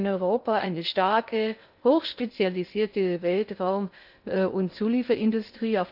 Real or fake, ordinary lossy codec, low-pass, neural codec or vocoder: fake; none; 5.4 kHz; codec, 16 kHz in and 24 kHz out, 0.6 kbps, FocalCodec, streaming, 2048 codes